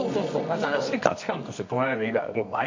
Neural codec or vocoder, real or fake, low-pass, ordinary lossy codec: codec, 24 kHz, 0.9 kbps, WavTokenizer, medium music audio release; fake; 7.2 kHz; MP3, 48 kbps